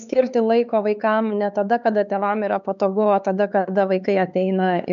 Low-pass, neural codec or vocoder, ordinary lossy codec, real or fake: 7.2 kHz; codec, 16 kHz, 4 kbps, X-Codec, HuBERT features, trained on LibriSpeech; AAC, 96 kbps; fake